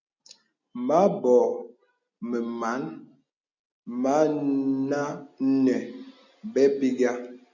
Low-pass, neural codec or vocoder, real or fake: 7.2 kHz; none; real